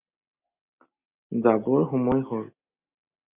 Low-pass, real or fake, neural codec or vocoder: 3.6 kHz; real; none